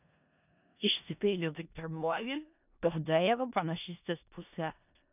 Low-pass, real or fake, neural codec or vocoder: 3.6 kHz; fake; codec, 16 kHz in and 24 kHz out, 0.4 kbps, LongCat-Audio-Codec, four codebook decoder